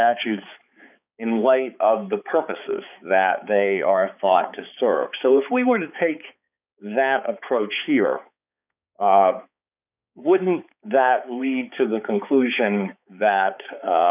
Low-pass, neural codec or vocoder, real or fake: 3.6 kHz; codec, 16 kHz, 4 kbps, X-Codec, HuBERT features, trained on balanced general audio; fake